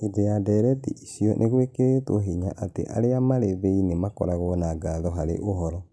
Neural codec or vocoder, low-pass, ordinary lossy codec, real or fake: none; none; none; real